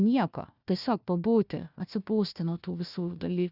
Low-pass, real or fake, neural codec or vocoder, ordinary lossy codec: 5.4 kHz; fake; codec, 16 kHz, 1 kbps, FunCodec, trained on Chinese and English, 50 frames a second; Opus, 64 kbps